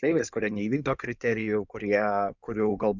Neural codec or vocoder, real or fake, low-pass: codec, 16 kHz in and 24 kHz out, 2.2 kbps, FireRedTTS-2 codec; fake; 7.2 kHz